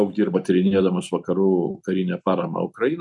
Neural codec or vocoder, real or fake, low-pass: none; real; 10.8 kHz